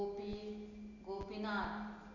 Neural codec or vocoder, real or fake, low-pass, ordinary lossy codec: none; real; 7.2 kHz; none